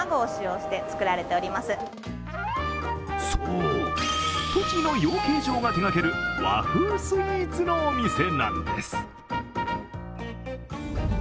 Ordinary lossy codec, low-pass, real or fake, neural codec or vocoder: none; none; real; none